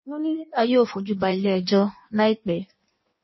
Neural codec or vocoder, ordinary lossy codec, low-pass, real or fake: codec, 16 kHz in and 24 kHz out, 1.1 kbps, FireRedTTS-2 codec; MP3, 24 kbps; 7.2 kHz; fake